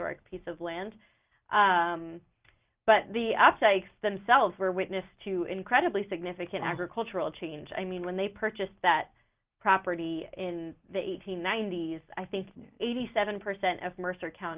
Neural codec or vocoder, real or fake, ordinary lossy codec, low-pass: none; real; Opus, 24 kbps; 3.6 kHz